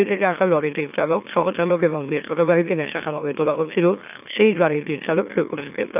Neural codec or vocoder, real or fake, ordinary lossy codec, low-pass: autoencoder, 44.1 kHz, a latent of 192 numbers a frame, MeloTTS; fake; AAC, 32 kbps; 3.6 kHz